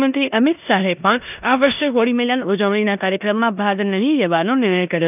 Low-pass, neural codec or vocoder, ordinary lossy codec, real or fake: 3.6 kHz; codec, 16 kHz in and 24 kHz out, 0.9 kbps, LongCat-Audio-Codec, four codebook decoder; none; fake